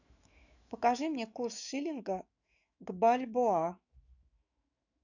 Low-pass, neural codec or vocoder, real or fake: 7.2 kHz; autoencoder, 48 kHz, 128 numbers a frame, DAC-VAE, trained on Japanese speech; fake